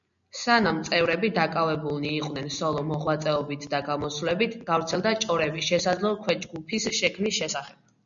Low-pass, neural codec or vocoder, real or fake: 7.2 kHz; none; real